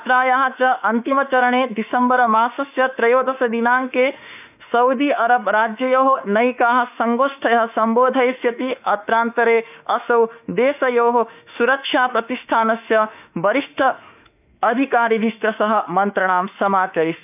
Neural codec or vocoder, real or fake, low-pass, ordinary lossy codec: autoencoder, 48 kHz, 32 numbers a frame, DAC-VAE, trained on Japanese speech; fake; 3.6 kHz; none